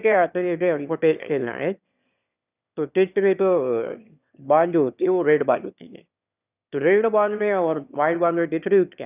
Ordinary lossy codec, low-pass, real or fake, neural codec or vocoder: none; 3.6 kHz; fake; autoencoder, 22.05 kHz, a latent of 192 numbers a frame, VITS, trained on one speaker